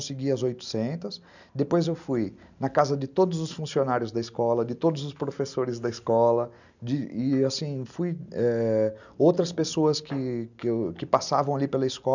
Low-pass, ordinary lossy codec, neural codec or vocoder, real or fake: 7.2 kHz; none; none; real